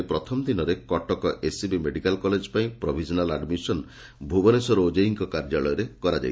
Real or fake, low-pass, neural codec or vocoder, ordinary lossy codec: real; none; none; none